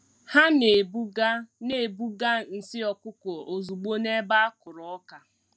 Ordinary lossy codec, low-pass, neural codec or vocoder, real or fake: none; none; none; real